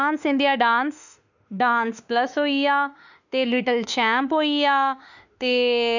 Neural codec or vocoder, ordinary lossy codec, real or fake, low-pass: autoencoder, 48 kHz, 32 numbers a frame, DAC-VAE, trained on Japanese speech; none; fake; 7.2 kHz